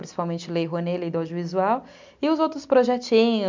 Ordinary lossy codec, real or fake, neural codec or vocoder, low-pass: MP3, 64 kbps; real; none; 7.2 kHz